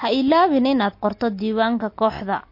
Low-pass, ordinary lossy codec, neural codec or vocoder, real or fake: 5.4 kHz; MP3, 32 kbps; none; real